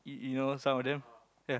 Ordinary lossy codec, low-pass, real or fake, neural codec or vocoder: none; none; real; none